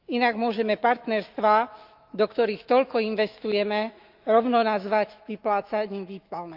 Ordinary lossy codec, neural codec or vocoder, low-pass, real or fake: Opus, 24 kbps; autoencoder, 48 kHz, 128 numbers a frame, DAC-VAE, trained on Japanese speech; 5.4 kHz; fake